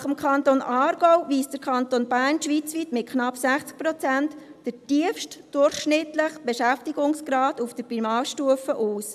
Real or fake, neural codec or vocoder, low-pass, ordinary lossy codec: real; none; 14.4 kHz; AAC, 96 kbps